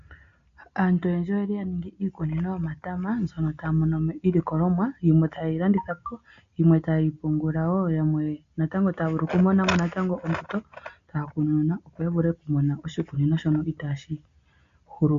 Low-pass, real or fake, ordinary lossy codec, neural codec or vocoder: 7.2 kHz; real; MP3, 48 kbps; none